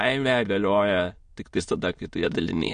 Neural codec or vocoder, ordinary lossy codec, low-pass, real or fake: autoencoder, 22.05 kHz, a latent of 192 numbers a frame, VITS, trained on many speakers; MP3, 48 kbps; 9.9 kHz; fake